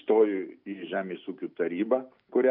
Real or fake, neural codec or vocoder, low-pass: real; none; 5.4 kHz